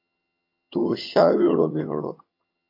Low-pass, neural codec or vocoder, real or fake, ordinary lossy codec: 5.4 kHz; vocoder, 22.05 kHz, 80 mel bands, HiFi-GAN; fake; MP3, 32 kbps